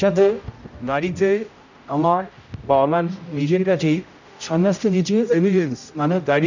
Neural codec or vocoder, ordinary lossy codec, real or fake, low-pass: codec, 16 kHz, 0.5 kbps, X-Codec, HuBERT features, trained on general audio; none; fake; 7.2 kHz